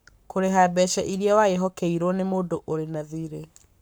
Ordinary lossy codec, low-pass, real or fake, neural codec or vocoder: none; none; fake; codec, 44.1 kHz, 7.8 kbps, Pupu-Codec